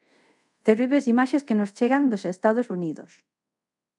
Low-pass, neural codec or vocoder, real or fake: 10.8 kHz; codec, 24 kHz, 0.5 kbps, DualCodec; fake